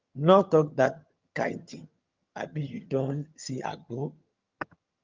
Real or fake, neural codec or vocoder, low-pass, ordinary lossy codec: fake; vocoder, 22.05 kHz, 80 mel bands, HiFi-GAN; 7.2 kHz; Opus, 24 kbps